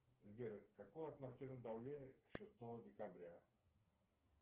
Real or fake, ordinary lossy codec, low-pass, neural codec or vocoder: fake; Opus, 16 kbps; 3.6 kHz; codec, 16 kHz, 4 kbps, FreqCodec, smaller model